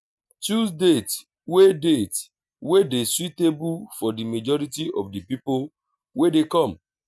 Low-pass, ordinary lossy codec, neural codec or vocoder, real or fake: none; none; none; real